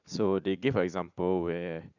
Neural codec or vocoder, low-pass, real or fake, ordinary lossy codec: vocoder, 44.1 kHz, 80 mel bands, Vocos; 7.2 kHz; fake; none